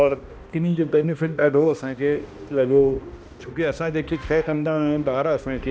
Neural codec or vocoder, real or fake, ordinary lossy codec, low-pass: codec, 16 kHz, 1 kbps, X-Codec, HuBERT features, trained on balanced general audio; fake; none; none